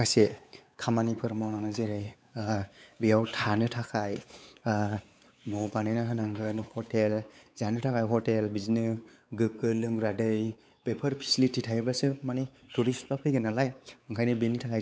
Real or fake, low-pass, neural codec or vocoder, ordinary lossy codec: fake; none; codec, 16 kHz, 4 kbps, X-Codec, WavLM features, trained on Multilingual LibriSpeech; none